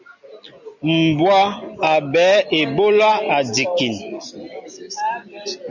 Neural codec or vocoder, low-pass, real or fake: none; 7.2 kHz; real